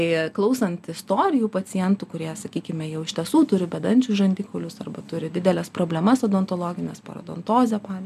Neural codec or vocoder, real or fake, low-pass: none; real; 14.4 kHz